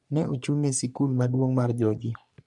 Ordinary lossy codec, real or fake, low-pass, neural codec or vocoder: none; fake; 10.8 kHz; codec, 44.1 kHz, 3.4 kbps, Pupu-Codec